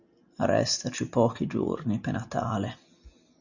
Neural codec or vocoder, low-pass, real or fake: none; 7.2 kHz; real